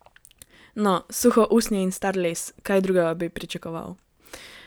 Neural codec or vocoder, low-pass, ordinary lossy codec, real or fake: none; none; none; real